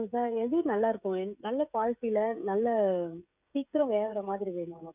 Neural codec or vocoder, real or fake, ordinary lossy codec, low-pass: codec, 16 kHz, 16 kbps, FreqCodec, smaller model; fake; none; 3.6 kHz